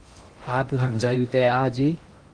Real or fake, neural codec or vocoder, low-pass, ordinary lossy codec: fake; codec, 16 kHz in and 24 kHz out, 0.6 kbps, FocalCodec, streaming, 2048 codes; 9.9 kHz; Opus, 24 kbps